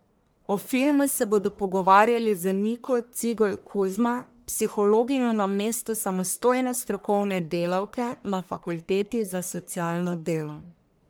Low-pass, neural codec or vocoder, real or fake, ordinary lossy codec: none; codec, 44.1 kHz, 1.7 kbps, Pupu-Codec; fake; none